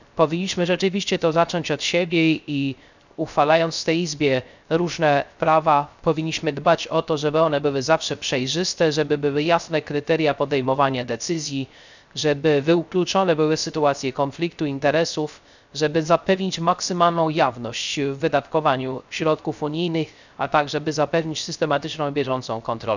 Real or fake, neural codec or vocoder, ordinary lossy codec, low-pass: fake; codec, 16 kHz, 0.3 kbps, FocalCodec; none; 7.2 kHz